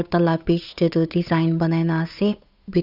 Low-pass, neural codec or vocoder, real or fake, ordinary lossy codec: 5.4 kHz; codec, 16 kHz, 8 kbps, FunCodec, trained on Chinese and English, 25 frames a second; fake; none